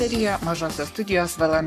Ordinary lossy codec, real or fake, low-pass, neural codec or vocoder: AAC, 96 kbps; fake; 14.4 kHz; codec, 44.1 kHz, 7.8 kbps, Pupu-Codec